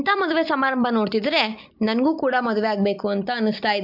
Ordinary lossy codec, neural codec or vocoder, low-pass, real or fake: MP3, 48 kbps; vocoder, 44.1 kHz, 128 mel bands every 256 samples, BigVGAN v2; 5.4 kHz; fake